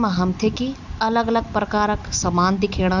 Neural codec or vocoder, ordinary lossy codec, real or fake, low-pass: none; none; real; 7.2 kHz